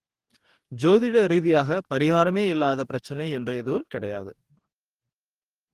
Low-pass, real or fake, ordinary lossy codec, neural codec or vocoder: 14.4 kHz; fake; Opus, 24 kbps; codec, 44.1 kHz, 2.6 kbps, DAC